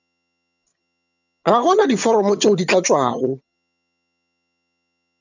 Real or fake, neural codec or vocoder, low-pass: fake; vocoder, 22.05 kHz, 80 mel bands, HiFi-GAN; 7.2 kHz